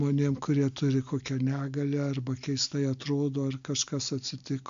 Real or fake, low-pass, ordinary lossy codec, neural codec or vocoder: real; 7.2 kHz; MP3, 64 kbps; none